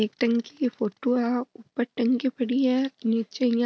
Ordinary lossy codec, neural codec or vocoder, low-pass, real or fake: none; none; none; real